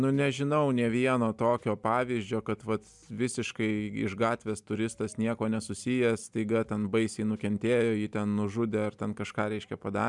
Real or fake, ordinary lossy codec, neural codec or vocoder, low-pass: real; MP3, 96 kbps; none; 10.8 kHz